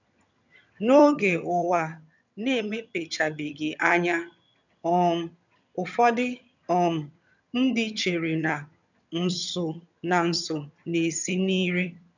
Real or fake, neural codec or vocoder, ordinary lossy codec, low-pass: fake; vocoder, 22.05 kHz, 80 mel bands, HiFi-GAN; none; 7.2 kHz